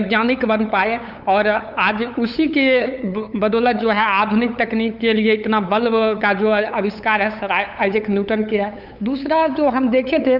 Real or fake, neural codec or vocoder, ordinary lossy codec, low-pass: fake; codec, 16 kHz, 8 kbps, FunCodec, trained on LibriTTS, 25 frames a second; none; 5.4 kHz